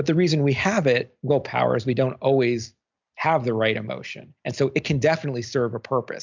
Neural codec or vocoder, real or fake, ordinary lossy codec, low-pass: none; real; MP3, 64 kbps; 7.2 kHz